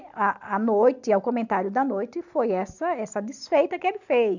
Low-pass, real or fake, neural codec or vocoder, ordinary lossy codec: 7.2 kHz; real; none; none